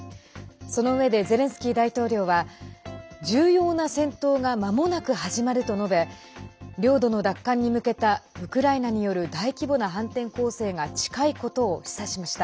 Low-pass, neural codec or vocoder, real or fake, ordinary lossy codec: none; none; real; none